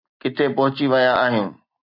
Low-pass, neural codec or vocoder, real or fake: 5.4 kHz; none; real